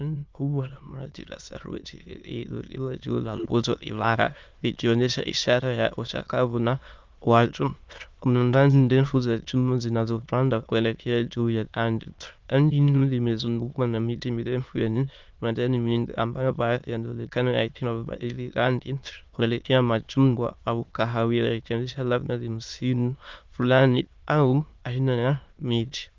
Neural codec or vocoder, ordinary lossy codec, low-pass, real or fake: autoencoder, 22.05 kHz, a latent of 192 numbers a frame, VITS, trained on many speakers; Opus, 32 kbps; 7.2 kHz; fake